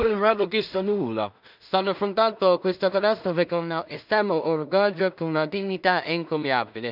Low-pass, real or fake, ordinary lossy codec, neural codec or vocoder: 5.4 kHz; fake; none; codec, 16 kHz in and 24 kHz out, 0.4 kbps, LongCat-Audio-Codec, two codebook decoder